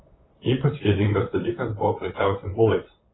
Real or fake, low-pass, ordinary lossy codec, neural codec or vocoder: fake; 7.2 kHz; AAC, 16 kbps; vocoder, 44.1 kHz, 128 mel bands, Pupu-Vocoder